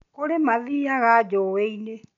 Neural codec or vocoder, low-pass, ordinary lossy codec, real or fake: none; 7.2 kHz; none; real